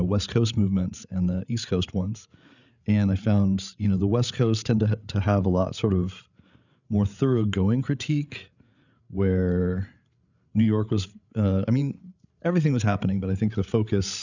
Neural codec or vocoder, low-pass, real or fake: codec, 16 kHz, 8 kbps, FreqCodec, larger model; 7.2 kHz; fake